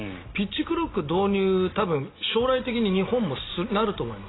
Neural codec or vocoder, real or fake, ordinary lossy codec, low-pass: none; real; AAC, 16 kbps; 7.2 kHz